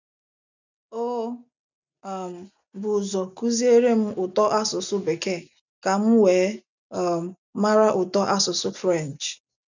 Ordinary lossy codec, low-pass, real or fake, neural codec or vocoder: none; 7.2 kHz; real; none